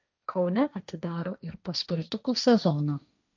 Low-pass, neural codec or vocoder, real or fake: 7.2 kHz; codec, 16 kHz, 1.1 kbps, Voila-Tokenizer; fake